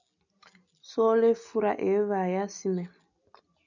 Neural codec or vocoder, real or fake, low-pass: none; real; 7.2 kHz